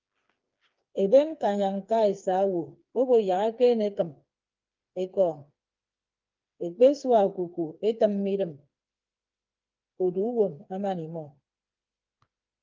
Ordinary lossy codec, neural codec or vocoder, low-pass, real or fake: Opus, 32 kbps; codec, 16 kHz, 4 kbps, FreqCodec, smaller model; 7.2 kHz; fake